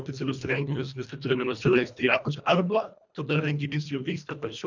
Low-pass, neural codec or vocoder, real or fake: 7.2 kHz; codec, 24 kHz, 1.5 kbps, HILCodec; fake